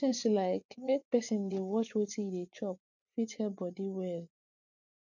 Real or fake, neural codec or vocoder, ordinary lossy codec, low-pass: fake; vocoder, 24 kHz, 100 mel bands, Vocos; none; 7.2 kHz